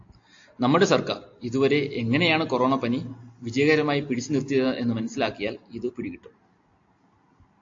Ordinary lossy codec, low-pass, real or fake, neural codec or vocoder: AAC, 48 kbps; 7.2 kHz; real; none